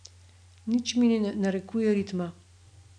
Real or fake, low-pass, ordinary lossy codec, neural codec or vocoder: real; 9.9 kHz; none; none